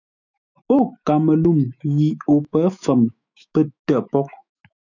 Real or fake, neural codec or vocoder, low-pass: fake; autoencoder, 48 kHz, 128 numbers a frame, DAC-VAE, trained on Japanese speech; 7.2 kHz